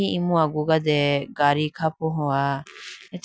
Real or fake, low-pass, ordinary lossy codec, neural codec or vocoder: real; none; none; none